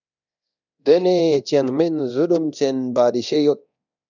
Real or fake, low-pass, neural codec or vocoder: fake; 7.2 kHz; codec, 24 kHz, 0.9 kbps, DualCodec